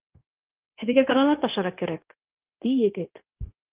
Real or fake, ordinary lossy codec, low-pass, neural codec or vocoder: fake; Opus, 24 kbps; 3.6 kHz; codec, 16 kHz, 0.9 kbps, LongCat-Audio-Codec